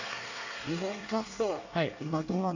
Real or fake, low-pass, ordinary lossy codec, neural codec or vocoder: fake; 7.2 kHz; none; codec, 24 kHz, 1 kbps, SNAC